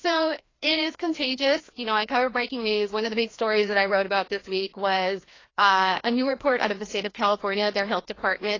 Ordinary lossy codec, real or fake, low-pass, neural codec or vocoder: AAC, 32 kbps; fake; 7.2 kHz; codec, 16 kHz, 1 kbps, FreqCodec, larger model